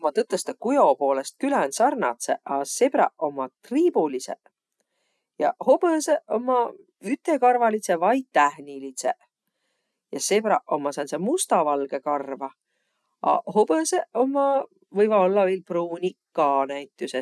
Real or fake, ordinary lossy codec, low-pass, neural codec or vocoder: real; none; none; none